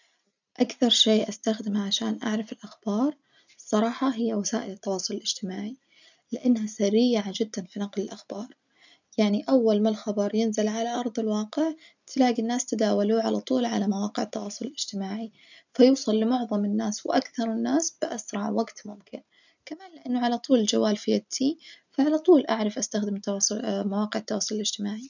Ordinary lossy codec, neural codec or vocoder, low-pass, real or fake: none; none; 7.2 kHz; real